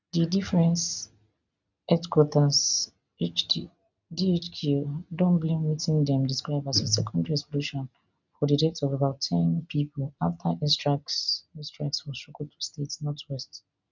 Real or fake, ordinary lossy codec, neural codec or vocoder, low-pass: real; none; none; 7.2 kHz